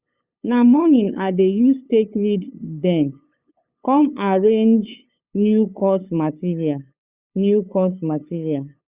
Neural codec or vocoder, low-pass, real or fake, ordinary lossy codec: codec, 16 kHz, 8 kbps, FunCodec, trained on LibriTTS, 25 frames a second; 3.6 kHz; fake; Opus, 64 kbps